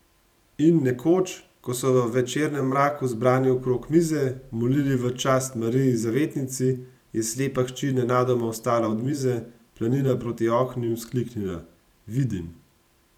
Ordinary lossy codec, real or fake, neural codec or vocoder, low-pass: none; fake; vocoder, 44.1 kHz, 128 mel bands every 512 samples, BigVGAN v2; 19.8 kHz